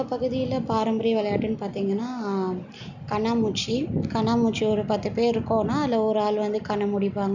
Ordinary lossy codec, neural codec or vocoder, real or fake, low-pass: none; none; real; 7.2 kHz